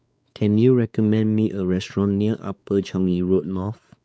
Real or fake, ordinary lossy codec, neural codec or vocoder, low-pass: fake; none; codec, 16 kHz, 4 kbps, X-Codec, WavLM features, trained on Multilingual LibriSpeech; none